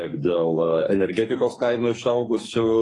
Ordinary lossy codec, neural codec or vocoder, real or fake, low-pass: AAC, 32 kbps; codec, 44.1 kHz, 2.6 kbps, SNAC; fake; 10.8 kHz